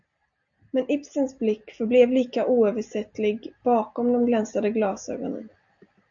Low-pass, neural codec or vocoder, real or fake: 7.2 kHz; none; real